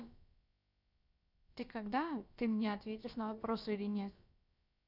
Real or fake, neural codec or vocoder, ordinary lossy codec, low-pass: fake; codec, 16 kHz, about 1 kbps, DyCAST, with the encoder's durations; none; 5.4 kHz